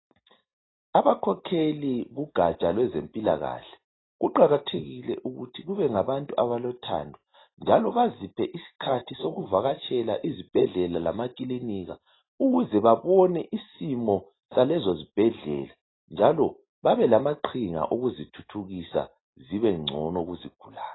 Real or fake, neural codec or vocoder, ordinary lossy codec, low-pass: real; none; AAC, 16 kbps; 7.2 kHz